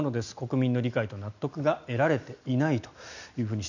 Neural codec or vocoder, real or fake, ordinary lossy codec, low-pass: none; real; none; 7.2 kHz